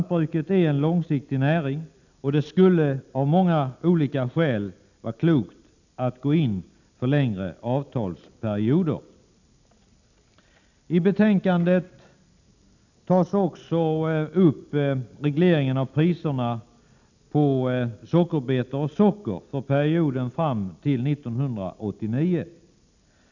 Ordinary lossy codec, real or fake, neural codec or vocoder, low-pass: none; real; none; 7.2 kHz